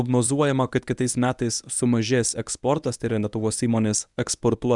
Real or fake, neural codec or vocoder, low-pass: fake; codec, 24 kHz, 0.9 kbps, WavTokenizer, medium speech release version 1; 10.8 kHz